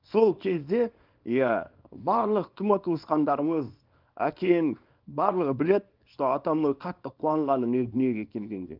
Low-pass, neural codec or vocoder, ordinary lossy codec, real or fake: 5.4 kHz; codec, 24 kHz, 0.9 kbps, WavTokenizer, small release; Opus, 24 kbps; fake